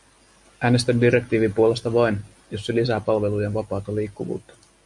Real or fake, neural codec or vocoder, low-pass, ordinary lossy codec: real; none; 10.8 kHz; MP3, 64 kbps